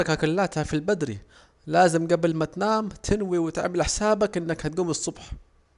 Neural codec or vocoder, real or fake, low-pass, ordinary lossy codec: none; real; 10.8 kHz; none